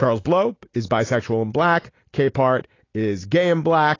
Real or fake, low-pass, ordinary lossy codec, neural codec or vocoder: real; 7.2 kHz; AAC, 32 kbps; none